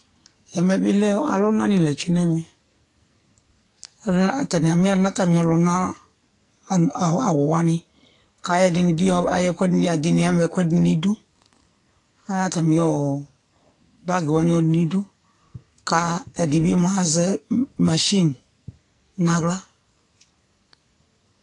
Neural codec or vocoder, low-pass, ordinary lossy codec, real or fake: codec, 44.1 kHz, 2.6 kbps, SNAC; 10.8 kHz; AAC, 48 kbps; fake